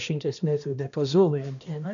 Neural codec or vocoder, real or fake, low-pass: codec, 16 kHz, 1 kbps, X-Codec, HuBERT features, trained on balanced general audio; fake; 7.2 kHz